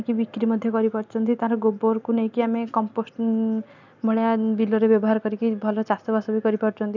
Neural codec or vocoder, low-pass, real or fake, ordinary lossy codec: none; 7.2 kHz; real; none